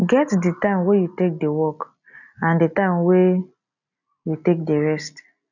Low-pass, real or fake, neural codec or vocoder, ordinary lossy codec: 7.2 kHz; real; none; none